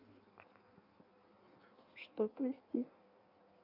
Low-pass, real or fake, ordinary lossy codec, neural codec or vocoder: 5.4 kHz; fake; none; codec, 16 kHz in and 24 kHz out, 1.1 kbps, FireRedTTS-2 codec